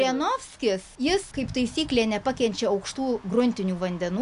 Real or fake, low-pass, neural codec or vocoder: real; 10.8 kHz; none